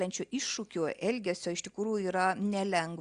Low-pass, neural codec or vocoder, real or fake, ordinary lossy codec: 9.9 kHz; none; real; Opus, 64 kbps